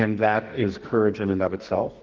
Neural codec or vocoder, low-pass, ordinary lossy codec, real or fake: codec, 44.1 kHz, 2.6 kbps, SNAC; 7.2 kHz; Opus, 16 kbps; fake